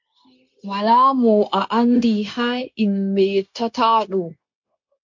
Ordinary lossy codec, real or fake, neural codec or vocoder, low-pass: MP3, 48 kbps; fake; codec, 16 kHz, 0.9 kbps, LongCat-Audio-Codec; 7.2 kHz